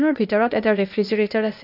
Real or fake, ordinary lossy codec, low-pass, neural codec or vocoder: fake; AAC, 48 kbps; 5.4 kHz; codec, 16 kHz, 0.8 kbps, ZipCodec